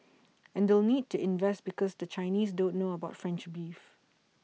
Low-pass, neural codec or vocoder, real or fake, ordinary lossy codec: none; none; real; none